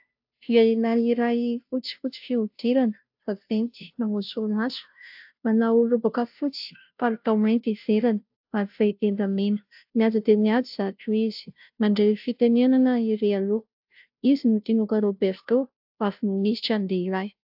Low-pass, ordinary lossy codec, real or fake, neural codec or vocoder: 5.4 kHz; AAC, 48 kbps; fake; codec, 16 kHz, 0.5 kbps, FunCodec, trained on Chinese and English, 25 frames a second